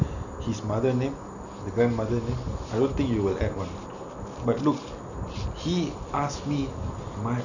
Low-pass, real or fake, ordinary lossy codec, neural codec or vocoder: 7.2 kHz; real; none; none